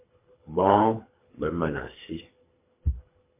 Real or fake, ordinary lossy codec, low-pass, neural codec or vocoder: fake; MP3, 24 kbps; 3.6 kHz; codec, 24 kHz, 3 kbps, HILCodec